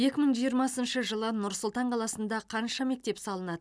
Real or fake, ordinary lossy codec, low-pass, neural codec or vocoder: real; none; none; none